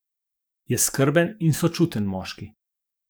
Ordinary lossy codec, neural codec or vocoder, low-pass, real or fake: none; codec, 44.1 kHz, 7.8 kbps, DAC; none; fake